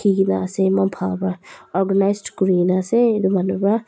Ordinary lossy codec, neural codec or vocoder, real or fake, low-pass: none; none; real; none